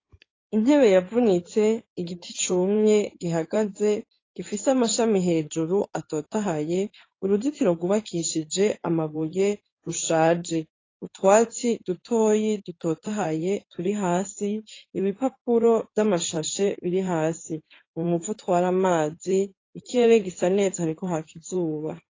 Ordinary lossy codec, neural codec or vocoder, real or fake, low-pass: AAC, 32 kbps; codec, 16 kHz in and 24 kHz out, 2.2 kbps, FireRedTTS-2 codec; fake; 7.2 kHz